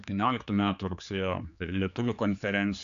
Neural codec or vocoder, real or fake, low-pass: codec, 16 kHz, 4 kbps, X-Codec, HuBERT features, trained on general audio; fake; 7.2 kHz